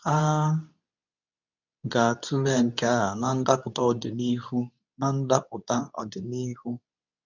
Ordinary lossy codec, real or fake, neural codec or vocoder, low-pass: none; fake; codec, 24 kHz, 0.9 kbps, WavTokenizer, medium speech release version 2; 7.2 kHz